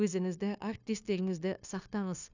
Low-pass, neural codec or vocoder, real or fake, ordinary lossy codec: 7.2 kHz; codec, 16 kHz, 0.9 kbps, LongCat-Audio-Codec; fake; none